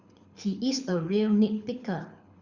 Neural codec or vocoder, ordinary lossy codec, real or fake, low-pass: codec, 24 kHz, 6 kbps, HILCodec; Opus, 64 kbps; fake; 7.2 kHz